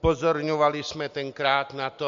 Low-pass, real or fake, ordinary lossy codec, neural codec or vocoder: 7.2 kHz; real; MP3, 48 kbps; none